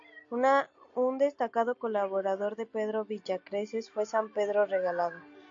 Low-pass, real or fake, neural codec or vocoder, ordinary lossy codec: 7.2 kHz; real; none; AAC, 48 kbps